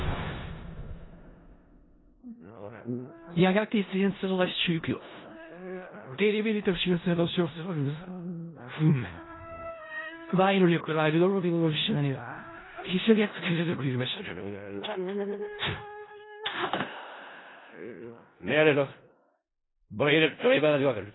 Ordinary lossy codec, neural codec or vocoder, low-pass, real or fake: AAC, 16 kbps; codec, 16 kHz in and 24 kHz out, 0.4 kbps, LongCat-Audio-Codec, four codebook decoder; 7.2 kHz; fake